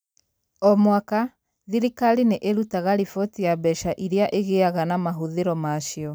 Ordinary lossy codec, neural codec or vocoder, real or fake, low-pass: none; none; real; none